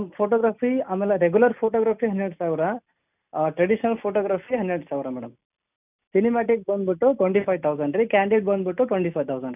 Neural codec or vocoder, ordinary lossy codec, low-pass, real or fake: none; none; 3.6 kHz; real